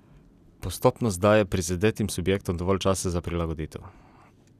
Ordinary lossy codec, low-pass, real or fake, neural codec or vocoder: none; 14.4 kHz; real; none